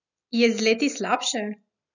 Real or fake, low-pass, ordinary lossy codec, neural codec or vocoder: real; 7.2 kHz; none; none